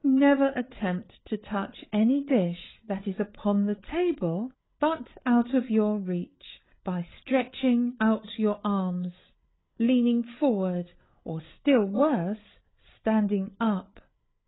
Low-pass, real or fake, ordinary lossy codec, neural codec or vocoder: 7.2 kHz; fake; AAC, 16 kbps; codec, 16 kHz, 8 kbps, FreqCodec, larger model